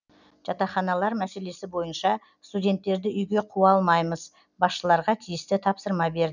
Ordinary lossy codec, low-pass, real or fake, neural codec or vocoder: none; 7.2 kHz; real; none